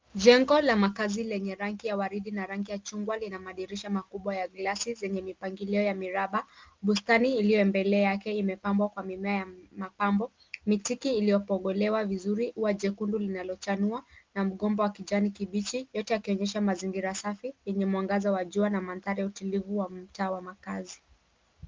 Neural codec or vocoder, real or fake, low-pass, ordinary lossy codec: none; real; 7.2 kHz; Opus, 32 kbps